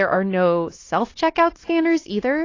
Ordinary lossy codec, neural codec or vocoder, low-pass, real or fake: AAC, 32 kbps; codec, 16 kHz, 0.9 kbps, LongCat-Audio-Codec; 7.2 kHz; fake